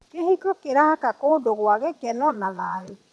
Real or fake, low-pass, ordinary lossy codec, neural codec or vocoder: fake; none; none; vocoder, 22.05 kHz, 80 mel bands, Vocos